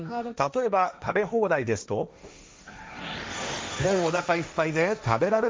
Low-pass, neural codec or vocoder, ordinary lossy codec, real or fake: none; codec, 16 kHz, 1.1 kbps, Voila-Tokenizer; none; fake